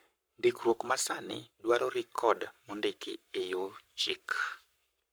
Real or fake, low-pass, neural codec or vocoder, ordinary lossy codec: fake; none; codec, 44.1 kHz, 7.8 kbps, Pupu-Codec; none